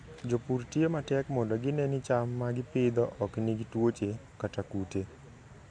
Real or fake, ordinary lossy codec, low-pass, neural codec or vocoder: real; MP3, 48 kbps; 9.9 kHz; none